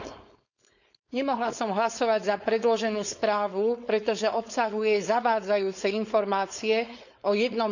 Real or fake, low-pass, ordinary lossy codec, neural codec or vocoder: fake; 7.2 kHz; none; codec, 16 kHz, 4.8 kbps, FACodec